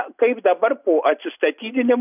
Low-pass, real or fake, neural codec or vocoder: 3.6 kHz; real; none